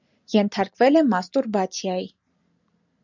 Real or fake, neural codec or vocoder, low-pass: real; none; 7.2 kHz